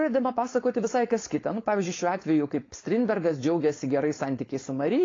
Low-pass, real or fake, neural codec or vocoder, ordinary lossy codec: 7.2 kHz; fake; codec, 16 kHz, 4.8 kbps, FACodec; AAC, 32 kbps